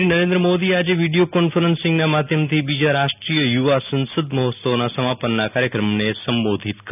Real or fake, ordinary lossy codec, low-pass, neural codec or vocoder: real; none; 3.6 kHz; none